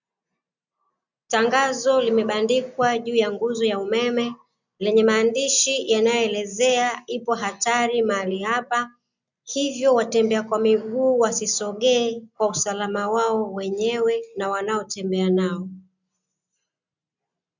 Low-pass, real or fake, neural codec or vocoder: 7.2 kHz; real; none